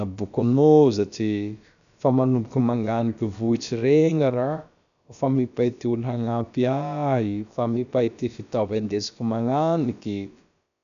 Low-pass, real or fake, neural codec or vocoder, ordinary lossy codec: 7.2 kHz; fake; codec, 16 kHz, about 1 kbps, DyCAST, with the encoder's durations; none